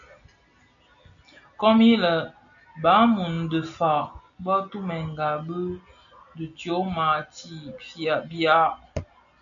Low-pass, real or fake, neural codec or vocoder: 7.2 kHz; real; none